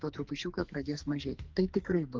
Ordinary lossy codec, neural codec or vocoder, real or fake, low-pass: Opus, 24 kbps; codec, 44.1 kHz, 2.6 kbps, SNAC; fake; 7.2 kHz